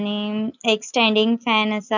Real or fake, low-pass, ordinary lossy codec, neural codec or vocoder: real; 7.2 kHz; none; none